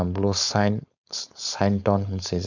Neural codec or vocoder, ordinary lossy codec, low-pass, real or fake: codec, 16 kHz, 4.8 kbps, FACodec; none; 7.2 kHz; fake